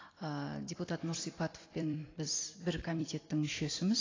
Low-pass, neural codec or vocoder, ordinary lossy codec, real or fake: 7.2 kHz; vocoder, 44.1 kHz, 128 mel bands every 256 samples, BigVGAN v2; AAC, 32 kbps; fake